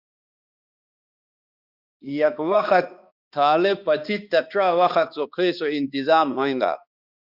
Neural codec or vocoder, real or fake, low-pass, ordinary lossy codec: codec, 16 kHz, 2 kbps, X-Codec, HuBERT features, trained on balanced general audio; fake; 5.4 kHz; Opus, 64 kbps